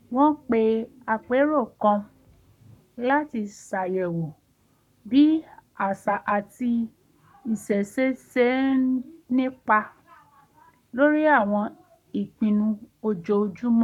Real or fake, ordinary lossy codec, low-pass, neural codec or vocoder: fake; none; 19.8 kHz; codec, 44.1 kHz, 7.8 kbps, Pupu-Codec